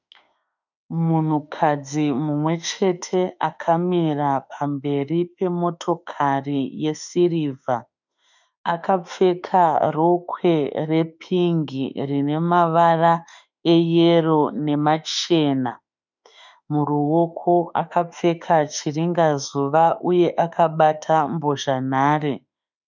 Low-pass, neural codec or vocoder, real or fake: 7.2 kHz; autoencoder, 48 kHz, 32 numbers a frame, DAC-VAE, trained on Japanese speech; fake